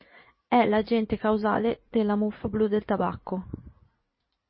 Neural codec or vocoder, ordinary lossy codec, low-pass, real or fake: vocoder, 44.1 kHz, 80 mel bands, Vocos; MP3, 24 kbps; 7.2 kHz; fake